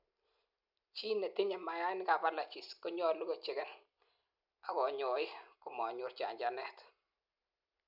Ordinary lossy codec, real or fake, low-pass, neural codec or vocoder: none; real; 5.4 kHz; none